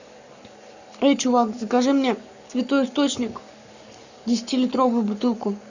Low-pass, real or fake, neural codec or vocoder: 7.2 kHz; fake; codec, 44.1 kHz, 7.8 kbps, Pupu-Codec